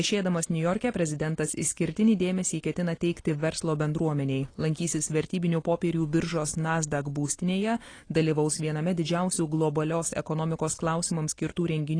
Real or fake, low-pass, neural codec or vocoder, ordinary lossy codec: real; 9.9 kHz; none; AAC, 32 kbps